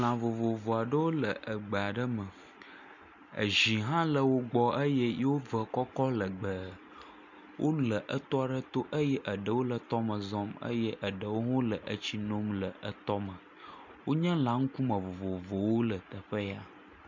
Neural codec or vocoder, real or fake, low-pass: none; real; 7.2 kHz